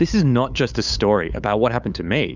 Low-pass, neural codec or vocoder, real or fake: 7.2 kHz; codec, 16 kHz, 8 kbps, FunCodec, trained on LibriTTS, 25 frames a second; fake